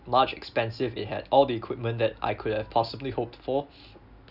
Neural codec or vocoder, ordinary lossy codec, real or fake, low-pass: none; none; real; 5.4 kHz